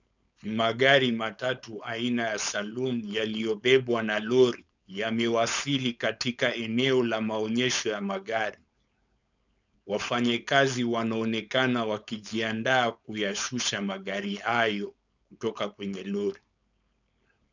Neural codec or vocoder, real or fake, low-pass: codec, 16 kHz, 4.8 kbps, FACodec; fake; 7.2 kHz